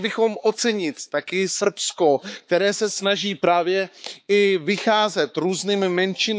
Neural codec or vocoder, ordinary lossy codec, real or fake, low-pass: codec, 16 kHz, 4 kbps, X-Codec, HuBERT features, trained on balanced general audio; none; fake; none